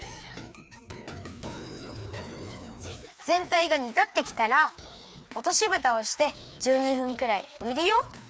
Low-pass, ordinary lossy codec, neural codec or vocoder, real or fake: none; none; codec, 16 kHz, 2 kbps, FreqCodec, larger model; fake